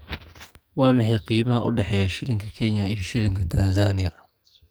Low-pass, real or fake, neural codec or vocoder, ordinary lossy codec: none; fake; codec, 44.1 kHz, 2.6 kbps, SNAC; none